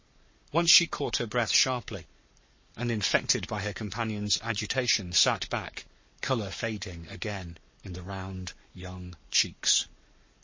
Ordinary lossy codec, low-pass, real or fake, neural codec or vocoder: MP3, 32 kbps; 7.2 kHz; fake; codec, 44.1 kHz, 7.8 kbps, Pupu-Codec